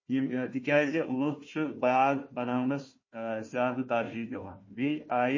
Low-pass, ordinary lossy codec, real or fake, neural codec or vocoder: 7.2 kHz; MP3, 32 kbps; fake; codec, 16 kHz, 1 kbps, FunCodec, trained on Chinese and English, 50 frames a second